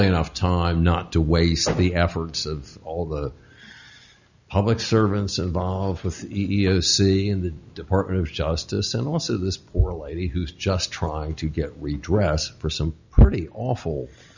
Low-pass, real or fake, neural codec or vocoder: 7.2 kHz; real; none